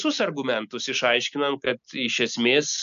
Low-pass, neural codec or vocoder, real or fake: 7.2 kHz; none; real